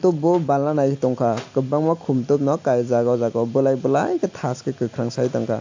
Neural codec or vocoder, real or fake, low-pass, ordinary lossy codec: none; real; 7.2 kHz; AAC, 48 kbps